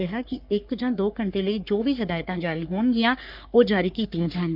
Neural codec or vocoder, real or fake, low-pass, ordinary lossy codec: codec, 44.1 kHz, 3.4 kbps, Pupu-Codec; fake; 5.4 kHz; none